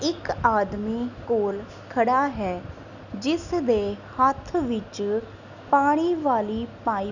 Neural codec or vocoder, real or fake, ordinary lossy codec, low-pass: none; real; none; 7.2 kHz